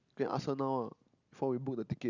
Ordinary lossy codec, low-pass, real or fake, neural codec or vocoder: none; 7.2 kHz; real; none